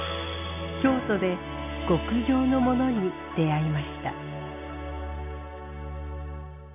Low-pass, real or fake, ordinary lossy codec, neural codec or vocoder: 3.6 kHz; real; none; none